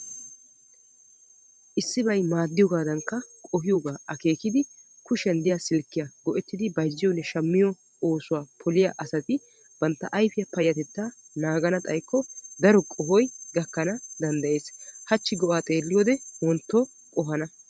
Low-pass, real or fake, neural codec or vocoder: 9.9 kHz; fake; vocoder, 44.1 kHz, 128 mel bands every 512 samples, BigVGAN v2